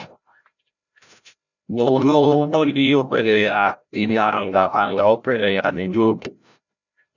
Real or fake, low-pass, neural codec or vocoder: fake; 7.2 kHz; codec, 16 kHz, 0.5 kbps, FreqCodec, larger model